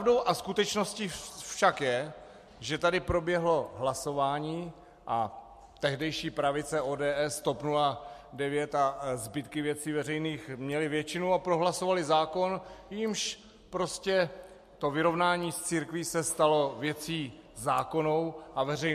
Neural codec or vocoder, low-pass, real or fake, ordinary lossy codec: none; 14.4 kHz; real; MP3, 64 kbps